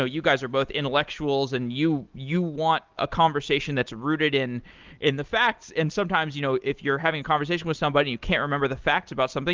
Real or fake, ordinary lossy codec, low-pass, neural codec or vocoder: real; Opus, 32 kbps; 7.2 kHz; none